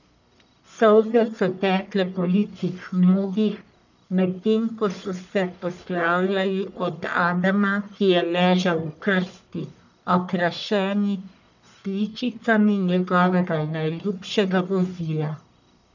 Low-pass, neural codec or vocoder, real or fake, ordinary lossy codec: 7.2 kHz; codec, 44.1 kHz, 1.7 kbps, Pupu-Codec; fake; none